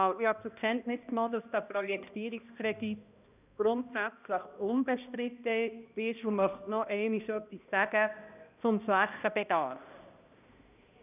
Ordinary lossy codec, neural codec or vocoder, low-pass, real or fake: none; codec, 16 kHz, 1 kbps, X-Codec, HuBERT features, trained on balanced general audio; 3.6 kHz; fake